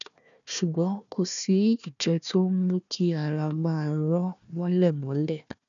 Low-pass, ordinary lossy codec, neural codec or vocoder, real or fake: 7.2 kHz; none; codec, 16 kHz, 1 kbps, FunCodec, trained on Chinese and English, 50 frames a second; fake